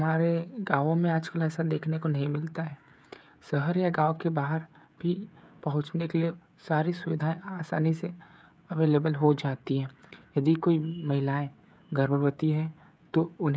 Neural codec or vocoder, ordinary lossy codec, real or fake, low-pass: codec, 16 kHz, 16 kbps, FreqCodec, smaller model; none; fake; none